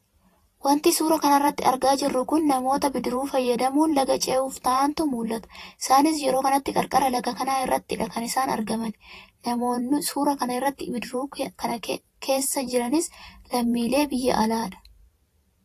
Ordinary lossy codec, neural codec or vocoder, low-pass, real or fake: AAC, 48 kbps; vocoder, 44.1 kHz, 128 mel bands every 512 samples, BigVGAN v2; 14.4 kHz; fake